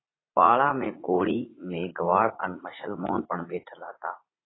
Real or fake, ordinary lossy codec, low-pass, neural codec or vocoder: fake; AAC, 16 kbps; 7.2 kHz; vocoder, 44.1 kHz, 80 mel bands, Vocos